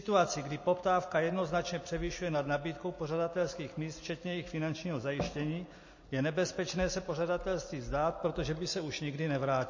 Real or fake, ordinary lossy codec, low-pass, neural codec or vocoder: real; MP3, 32 kbps; 7.2 kHz; none